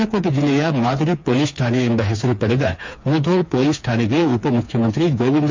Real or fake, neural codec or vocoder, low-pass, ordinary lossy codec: fake; codec, 16 kHz, 4 kbps, FreqCodec, smaller model; 7.2 kHz; MP3, 64 kbps